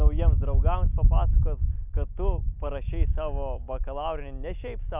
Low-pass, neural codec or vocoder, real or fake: 3.6 kHz; none; real